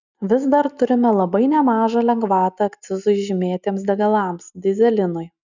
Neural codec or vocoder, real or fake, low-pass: none; real; 7.2 kHz